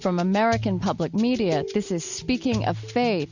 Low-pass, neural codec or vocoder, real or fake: 7.2 kHz; none; real